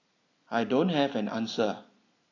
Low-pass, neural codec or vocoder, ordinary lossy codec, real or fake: 7.2 kHz; none; AAC, 32 kbps; real